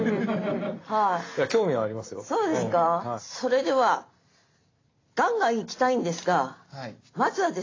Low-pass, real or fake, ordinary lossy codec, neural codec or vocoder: 7.2 kHz; real; AAC, 32 kbps; none